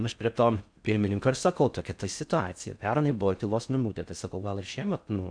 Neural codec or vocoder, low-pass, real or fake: codec, 16 kHz in and 24 kHz out, 0.6 kbps, FocalCodec, streaming, 4096 codes; 9.9 kHz; fake